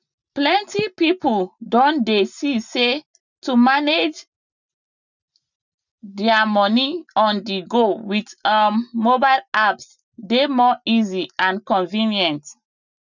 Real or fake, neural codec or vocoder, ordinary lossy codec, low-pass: real; none; none; 7.2 kHz